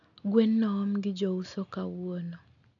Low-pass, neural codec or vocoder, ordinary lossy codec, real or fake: 7.2 kHz; none; none; real